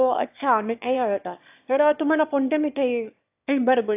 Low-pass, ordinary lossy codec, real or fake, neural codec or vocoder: 3.6 kHz; AAC, 32 kbps; fake; autoencoder, 22.05 kHz, a latent of 192 numbers a frame, VITS, trained on one speaker